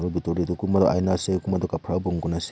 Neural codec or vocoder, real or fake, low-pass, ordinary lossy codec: none; real; none; none